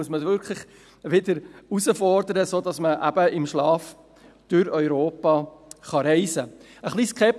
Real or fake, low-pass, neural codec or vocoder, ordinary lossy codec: real; none; none; none